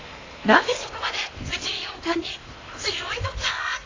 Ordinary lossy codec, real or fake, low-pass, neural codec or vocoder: AAC, 32 kbps; fake; 7.2 kHz; codec, 16 kHz in and 24 kHz out, 0.8 kbps, FocalCodec, streaming, 65536 codes